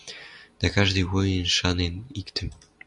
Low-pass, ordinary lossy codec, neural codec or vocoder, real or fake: 10.8 kHz; Opus, 64 kbps; none; real